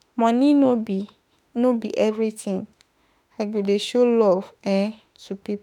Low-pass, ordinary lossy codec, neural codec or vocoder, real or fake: 19.8 kHz; none; autoencoder, 48 kHz, 32 numbers a frame, DAC-VAE, trained on Japanese speech; fake